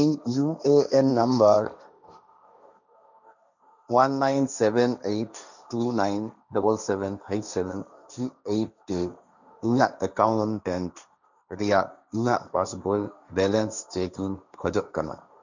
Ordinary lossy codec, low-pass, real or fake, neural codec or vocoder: none; 7.2 kHz; fake; codec, 16 kHz, 1.1 kbps, Voila-Tokenizer